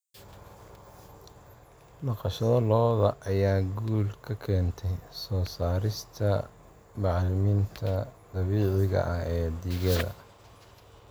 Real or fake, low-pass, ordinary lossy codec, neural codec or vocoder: real; none; none; none